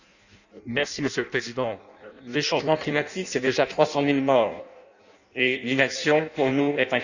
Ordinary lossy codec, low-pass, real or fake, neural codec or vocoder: none; 7.2 kHz; fake; codec, 16 kHz in and 24 kHz out, 0.6 kbps, FireRedTTS-2 codec